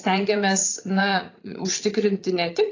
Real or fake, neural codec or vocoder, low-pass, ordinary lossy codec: fake; vocoder, 44.1 kHz, 80 mel bands, Vocos; 7.2 kHz; AAC, 32 kbps